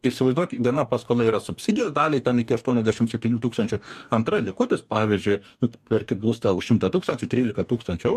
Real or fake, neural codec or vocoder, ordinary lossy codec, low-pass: fake; codec, 44.1 kHz, 2.6 kbps, DAC; MP3, 96 kbps; 14.4 kHz